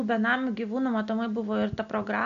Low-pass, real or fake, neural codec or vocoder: 7.2 kHz; real; none